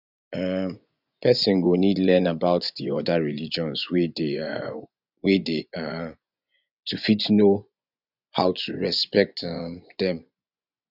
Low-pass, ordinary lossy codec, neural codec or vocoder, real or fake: 5.4 kHz; none; vocoder, 24 kHz, 100 mel bands, Vocos; fake